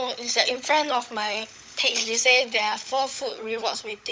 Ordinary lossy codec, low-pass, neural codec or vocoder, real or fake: none; none; codec, 16 kHz, 16 kbps, FunCodec, trained on LibriTTS, 50 frames a second; fake